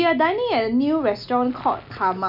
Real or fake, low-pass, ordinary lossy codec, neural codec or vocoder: real; 5.4 kHz; none; none